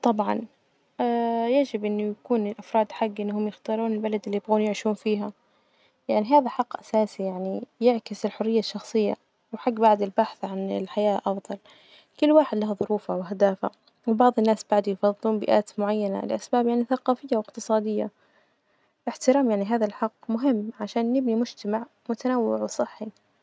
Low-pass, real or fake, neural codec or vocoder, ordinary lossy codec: none; real; none; none